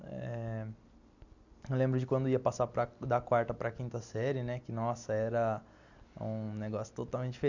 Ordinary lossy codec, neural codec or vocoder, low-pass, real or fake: none; none; 7.2 kHz; real